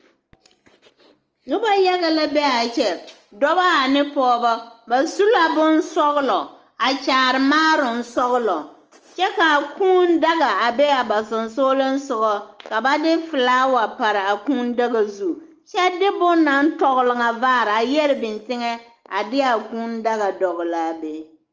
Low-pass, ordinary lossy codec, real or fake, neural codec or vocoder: 7.2 kHz; Opus, 24 kbps; real; none